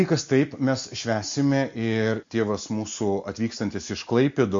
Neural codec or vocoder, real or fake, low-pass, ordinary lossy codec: none; real; 7.2 kHz; MP3, 48 kbps